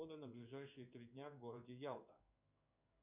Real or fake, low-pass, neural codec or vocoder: fake; 3.6 kHz; codec, 16 kHz in and 24 kHz out, 1 kbps, XY-Tokenizer